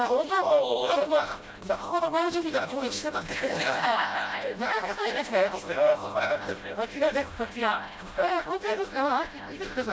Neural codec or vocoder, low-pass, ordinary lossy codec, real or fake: codec, 16 kHz, 0.5 kbps, FreqCodec, smaller model; none; none; fake